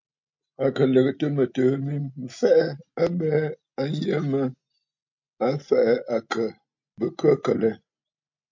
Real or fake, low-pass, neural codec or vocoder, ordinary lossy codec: real; 7.2 kHz; none; MP3, 48 kbps